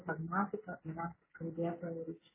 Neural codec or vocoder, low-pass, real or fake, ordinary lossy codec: none; 3.6 kHz; real; MP3, 16 kbps